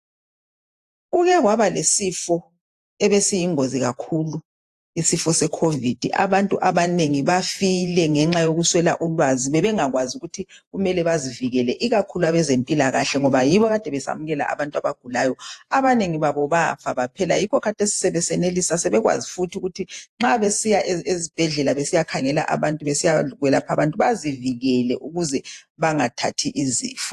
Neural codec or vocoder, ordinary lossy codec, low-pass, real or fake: vocoder, 48 kHz, 128 mel bands, Vocos; AAC, 64 kbps; 14.4 kHz; fake